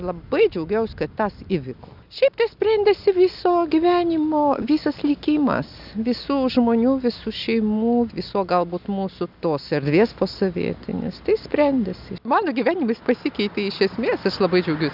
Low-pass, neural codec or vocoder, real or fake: 5.4 kHz; none; real